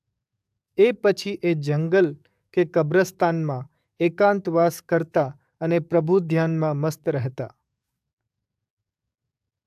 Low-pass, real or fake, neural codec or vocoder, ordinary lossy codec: 14.4 kHz; fake; codec, 44.1 kHz, 7.8 kbps, DAC; AAC, 96 kbps